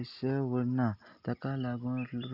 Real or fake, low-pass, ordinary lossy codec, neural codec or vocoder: real; 5.4 kHz; none; none